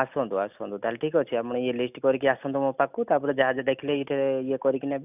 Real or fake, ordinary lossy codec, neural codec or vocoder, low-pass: real; none; none; 3.6 kHz